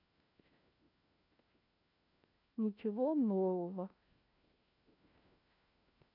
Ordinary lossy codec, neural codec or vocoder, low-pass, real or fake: none; codec, 16 kHz, 1 kbps, FunCodec, trained on LibriTTS, 50 frames a second; 5.4 kHz; fake